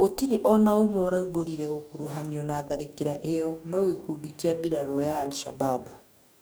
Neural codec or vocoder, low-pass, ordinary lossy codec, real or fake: codec, 44.1 kHz, 2.6 kbps, DAC; none; none; fake